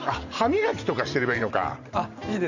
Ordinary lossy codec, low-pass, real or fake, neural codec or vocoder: none; 7.2 kHz; fake; vocoder, 44.1 kHz, 128 mel bands every 512 samples, BigVGAN v2